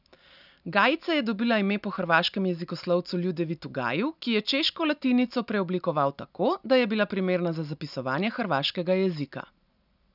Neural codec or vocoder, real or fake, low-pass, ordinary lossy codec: none; real; 5.4 kHz; none